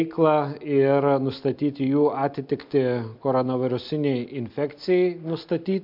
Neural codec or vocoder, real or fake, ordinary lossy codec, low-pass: none; real; MP3, 48 kbps; 5.4 kHz